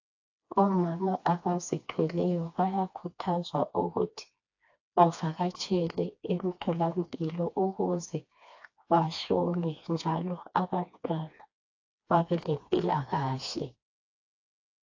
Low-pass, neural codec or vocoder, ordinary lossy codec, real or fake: 7.2 kHz; codec, 16 kHz, 2 kbps, FreqCodec, smaller model; AAC, 48 kbps; fake